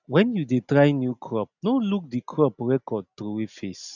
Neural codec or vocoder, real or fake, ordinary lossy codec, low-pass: none; real; none; 7.2 kHz